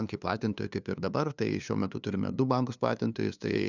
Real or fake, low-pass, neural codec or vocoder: fake; 7.2 kHz; codec, 16 kHz, 2 kbps, FunCodec, trained on LibriTTS, 25 frames a second